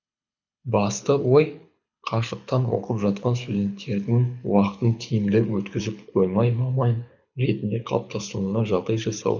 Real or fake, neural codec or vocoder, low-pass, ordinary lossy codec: fake; codec, 24 kHz, 6 kbps, HILCodec; 7.2 kHz; none